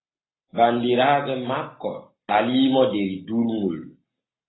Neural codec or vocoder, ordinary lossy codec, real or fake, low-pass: none; AAC, 16 kbps; real; 7.2 kHz